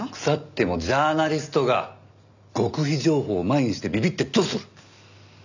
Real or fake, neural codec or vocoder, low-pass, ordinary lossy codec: real; none; 7.2 kHz; none